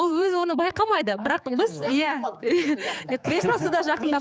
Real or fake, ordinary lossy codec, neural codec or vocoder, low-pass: fake; none; codec, 16 kHz, 4 kbps, X-Codec, HuBERT features, trained on general audio; none